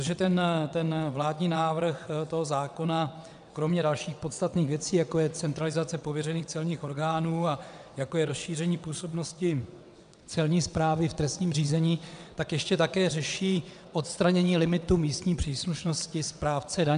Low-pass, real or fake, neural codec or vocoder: 9.9 kHz; fake; vocoder, 22.05 kHz, 80 mel bands, WaveNeXt